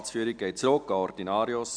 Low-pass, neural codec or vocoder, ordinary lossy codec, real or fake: 9.9 kHz; none; none; real